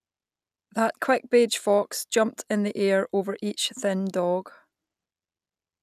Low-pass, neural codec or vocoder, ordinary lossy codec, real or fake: 14.4 kHz; none; none; real